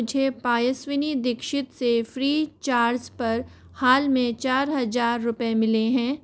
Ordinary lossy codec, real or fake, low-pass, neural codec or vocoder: none; real; none; none